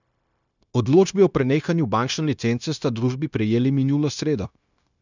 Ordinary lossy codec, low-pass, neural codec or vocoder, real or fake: none; 7.2 kHz; codec, 16 kHz, 0.9 kbps, LongCat-Audio-Codec; fake